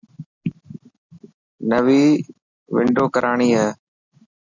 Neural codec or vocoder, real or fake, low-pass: none; real; 7.2 kHz